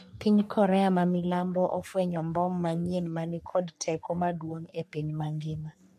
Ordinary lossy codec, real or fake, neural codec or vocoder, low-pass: MP3, 64 kbps; fake; codec, 44.1 kHz, 3.4 kbps, Pupu-Codec; 14.4 kHz